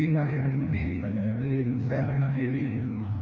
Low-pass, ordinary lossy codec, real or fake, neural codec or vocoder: 7.2 kHz; none; fake; codec, 16 kHz, 1 kbps, FreqCodec, larger model